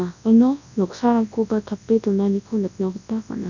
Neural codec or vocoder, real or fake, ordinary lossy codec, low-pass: codec, 24 kHz, 0.9 kbps, WavTokenizer, large speech release; fake; none; 7.2 kHz